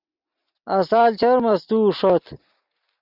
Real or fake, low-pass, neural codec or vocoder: real; 5.4 kHz; none